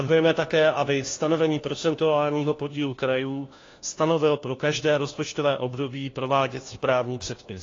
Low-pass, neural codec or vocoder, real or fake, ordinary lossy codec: 7.2 kHz; codec, 16 kHz, 1 kbps, FunCodec, trained on LibriTTS, 50 frames a second; fake; AAC, 32 kbps